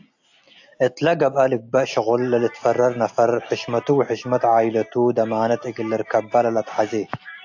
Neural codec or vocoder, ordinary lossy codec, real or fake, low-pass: none; AAC, 48 kbps; real; 7.2 kHz